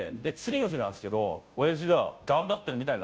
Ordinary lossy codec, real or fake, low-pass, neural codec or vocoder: none; fake; none; codec, 16 kHz, 0.5 kbps, FunCodec, trained on Chinese and English, 25 frames a second